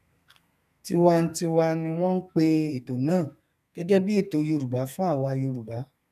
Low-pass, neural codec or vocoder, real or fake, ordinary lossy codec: 14.4 kHz; codec, 32 kHz, 1.9 kbps, SNAC; fake; none